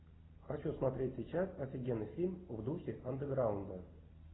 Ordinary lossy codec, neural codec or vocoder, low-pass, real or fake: AAC, 16 kbps; none; 7.2 kHz; real